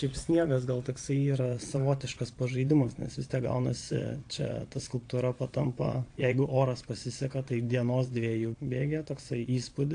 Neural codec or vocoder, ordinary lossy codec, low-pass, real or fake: vocoder, 22.05 kHz, 80 mel bands, Vocos; AAC, 48 kbps; 9.9 kHz; fake